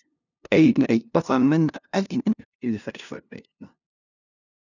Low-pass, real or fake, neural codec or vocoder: 7.2 kHz; fake; codec, 16 kHz, 0.5 kbps, FunCodec, trained on LibriTTS, 25 frames a second